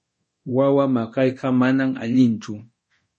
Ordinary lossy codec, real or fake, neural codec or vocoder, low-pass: MP3, 32 kbps; fake; codec, 24 kHz, 0.9 kbps, DualCodec; 10.8 kHz